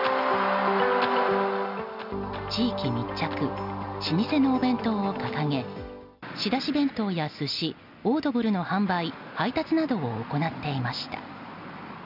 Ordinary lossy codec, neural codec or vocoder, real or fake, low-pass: none; none; real; 5.4 kHz